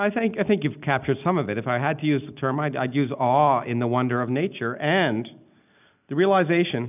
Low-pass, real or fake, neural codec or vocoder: 3.6 kHz; real; none